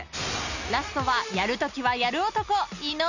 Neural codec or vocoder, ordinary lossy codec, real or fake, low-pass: none; none; real; 7.2 kHz